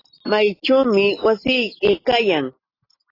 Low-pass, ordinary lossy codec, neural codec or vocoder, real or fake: 5.4 kHz; AAC, 24 kbps; none; real